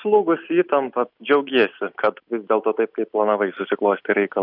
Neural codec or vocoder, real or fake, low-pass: none; real; 5.4 kHz